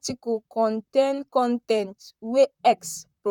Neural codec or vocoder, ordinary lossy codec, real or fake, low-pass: vocoder, 44.1 kHz, 128 mel bands, Pupu-Vocoder; none; fake; 19.8 kHz